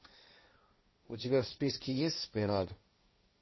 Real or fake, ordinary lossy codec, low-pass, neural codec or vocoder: fake; MP3, 24 kbps; 7.2 kHz; codec, 16 kHz, 1.1 kbps, Voila-Tokenizer